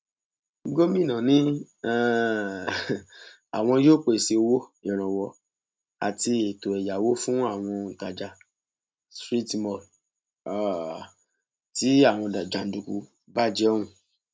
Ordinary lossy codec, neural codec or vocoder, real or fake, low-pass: none; none; real; none